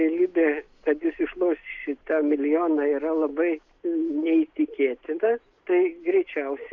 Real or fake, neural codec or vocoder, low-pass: real; none; 7.2 kHz